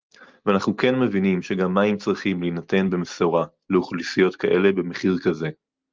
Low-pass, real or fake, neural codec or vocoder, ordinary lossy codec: 7.2 kHz; real; none; Opus, 32 kbps